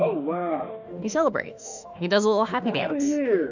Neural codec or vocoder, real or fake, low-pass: autoencoder, 48 kHz, 32 numbers a frame, DAC-VAE, trained on Japanese speech; fake; 7.2 kHz